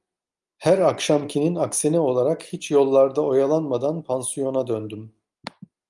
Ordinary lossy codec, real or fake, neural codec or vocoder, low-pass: Opus, 32 kbps; real; none; 10.8 kHz